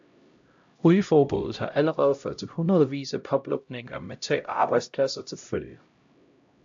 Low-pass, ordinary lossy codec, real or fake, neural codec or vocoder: 7.2 kHz; AAC, 64 kbps; fake; codec, 16 kHz, 0.5 kbps, X-Codec, HuBERT features, trained on LibriSpeech